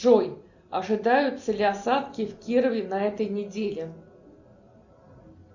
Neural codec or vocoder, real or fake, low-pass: none; real; 7.2 kHz